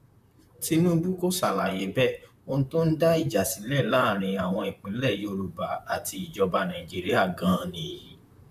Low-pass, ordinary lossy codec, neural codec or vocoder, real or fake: 14.4 kHz; none; vocoder, 44.1 kHz, 128 mel bands, Pupu-Vocoder; fake